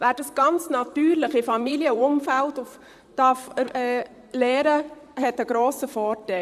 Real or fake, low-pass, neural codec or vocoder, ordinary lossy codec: fake; 14.4 kHz; vocoder, 44.1 kHz, 128 mel bands, Pupu-Vocoder; none